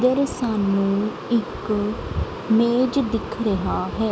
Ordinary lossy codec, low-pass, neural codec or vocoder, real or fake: none; none; none; real